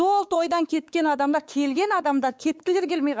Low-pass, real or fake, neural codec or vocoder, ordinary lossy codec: none; fake; codec, 16 kHz, 4 kbps, X-Codec, WavLM features, trained on Multilingual LibriSpeech; none